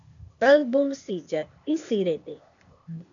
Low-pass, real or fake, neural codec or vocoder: 7.2 kHz; fake; codec, 16 kHz, 0.8 kbps, ZipCodec